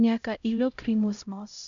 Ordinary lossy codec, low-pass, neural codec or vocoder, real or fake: none; 7.2 kHz; codec, 16 kHz, 0.5 kbps, X-Codec, HuBERT features, trained on LibriSpeech; fake